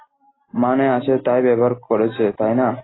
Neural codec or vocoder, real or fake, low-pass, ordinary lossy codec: none; real; 7.2 kHz; AAC, 16 kbps